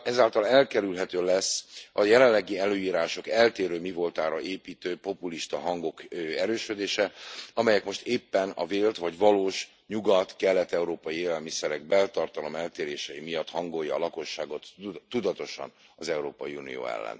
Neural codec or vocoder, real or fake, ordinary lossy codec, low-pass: none; real; none; none